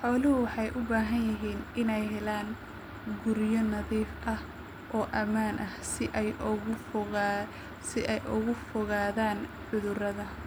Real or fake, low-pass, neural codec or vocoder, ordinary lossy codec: real; none; none; none